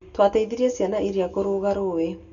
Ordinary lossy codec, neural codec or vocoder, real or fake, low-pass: none; none; real; 7.2 kHz